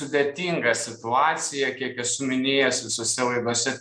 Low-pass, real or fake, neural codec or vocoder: 9.9 kHz; real; none